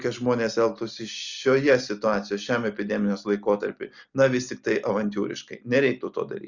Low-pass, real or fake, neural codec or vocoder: 7.2 kHz; real; none